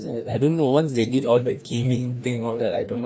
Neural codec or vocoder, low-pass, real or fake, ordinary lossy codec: codec, 16 kHz, 1 kbps, FreqCodec, larger model; none; fake; none